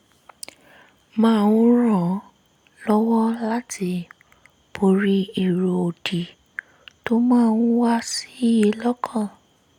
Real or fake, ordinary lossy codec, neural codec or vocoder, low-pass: real; none; none; 19.8 kHz